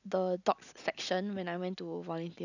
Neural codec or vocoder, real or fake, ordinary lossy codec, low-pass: none; real; none; 7.2 kHz